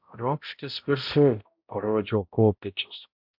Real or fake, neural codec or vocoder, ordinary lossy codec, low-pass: fake; codec, 16 kHz, 0.5 kbps, X-Codec, HuBERT features, trained on balanced general audio; MP3, 48 kbps; 5.4 kHz